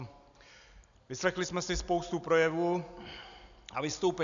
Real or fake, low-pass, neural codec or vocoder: real; 7.2 kHz; none